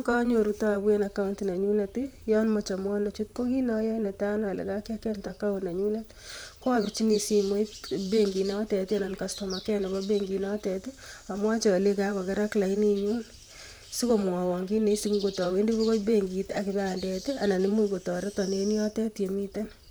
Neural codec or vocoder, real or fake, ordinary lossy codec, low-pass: vocoder, 44.1 kHz, 128 mel bands, Pupu-Vocoder; fake; none; none